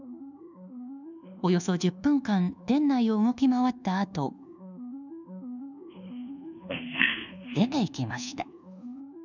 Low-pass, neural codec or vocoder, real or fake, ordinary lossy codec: 7.2 kHz; codec, 24 kHz, 1.2 kbps, DualCodec; fake; none